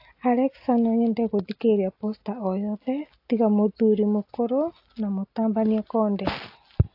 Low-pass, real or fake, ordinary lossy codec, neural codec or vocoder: 5.4 kHz; real; AAC, 32 kbps; none